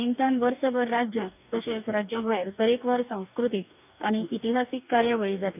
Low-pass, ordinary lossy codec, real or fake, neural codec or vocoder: 3.6 kHz; none; fake; codec, 44.1 kHz, 2.6 kbps, DAC